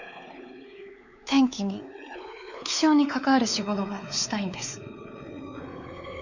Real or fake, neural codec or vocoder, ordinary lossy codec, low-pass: fake; codec, 16 kHz, 4 kbps, X-Codec, WavLM features, trained on Multilingual LibriSpeech; none; 7.2 kHz